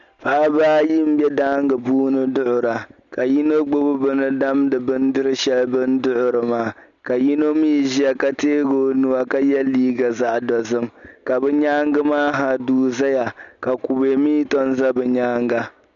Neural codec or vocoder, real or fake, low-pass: none; real; 7.2 kHz